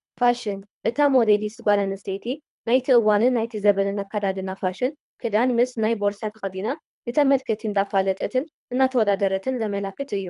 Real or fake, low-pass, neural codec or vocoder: fake; 10.8 kHz; codec, 24 kHz, 3 kbps, HILCodec